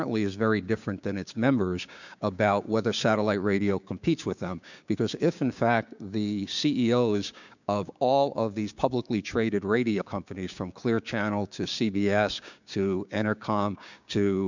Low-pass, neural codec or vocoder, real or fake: 7.2 kHz; codec, 16 kHz, 6 kbps, DAC; fake